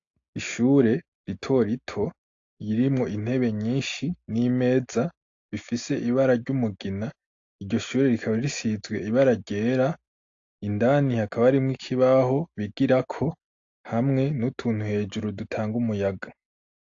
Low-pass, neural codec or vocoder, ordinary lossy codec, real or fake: 7.2 kHz; none; AAC, 48 kbps; real